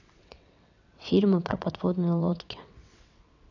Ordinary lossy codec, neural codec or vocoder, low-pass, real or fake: none; vocoder, 22.05 kHz, 80 mel bands, WaveNeXt; 7.2 kHz; fake